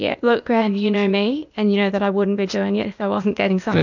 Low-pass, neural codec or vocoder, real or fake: 7.2 kHz; codec, 16 kHz, 0.8 kbps, ZipCodec; fake